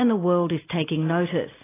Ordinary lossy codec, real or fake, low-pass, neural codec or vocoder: AAC, 16 kbps; real; 3.6 kHz; none